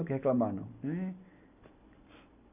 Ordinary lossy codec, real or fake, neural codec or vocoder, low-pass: none; real; none; 3.6 kHz